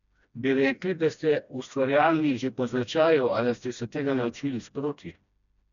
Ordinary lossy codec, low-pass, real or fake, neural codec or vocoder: none; 7.2 kHz; fake; codec, 16 kHz, 1 kbps, FreqCodec, smaller model